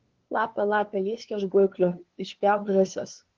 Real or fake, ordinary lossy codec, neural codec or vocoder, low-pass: fake; Opus, 16 kbps; codec, 16 kHz, 2 kbps, FunCodec, trained on LibriTTS, 25 frames a second; 7.2 kHz